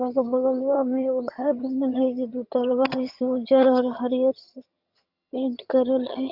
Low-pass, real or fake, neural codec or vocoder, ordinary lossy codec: 5.4 kHz; fake; vocoder, 22.05 kHz, 80 mel bands, HiFi-GAN; Opus, 64 kbps